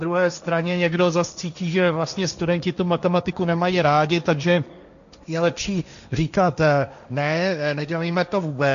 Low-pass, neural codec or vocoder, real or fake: 7.2 kHz; codec, 16 kHz, 1.1 kbps, Voila-Tokenizer; fake